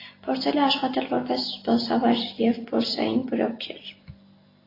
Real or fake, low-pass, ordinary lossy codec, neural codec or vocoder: real; 5.4 kHz; AAC, 24 kbps; none